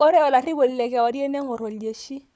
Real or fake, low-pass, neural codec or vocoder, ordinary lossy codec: fake; none; codec, 16 kHz, 4 kbps, FunCodec, trained on Chinese and English, 50 frames a second; none